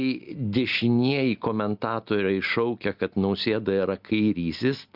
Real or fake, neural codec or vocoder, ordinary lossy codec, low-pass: real; none; Opus, 64 kbps; 5.4 kHz